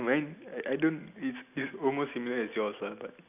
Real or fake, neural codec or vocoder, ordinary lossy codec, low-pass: real; none; none; 3.6 kHz